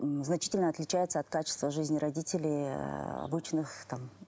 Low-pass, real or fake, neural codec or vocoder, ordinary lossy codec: none; real; none; none